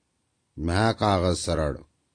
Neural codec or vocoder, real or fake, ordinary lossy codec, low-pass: none; real; AAC, 48 kbps; 9.9 kHz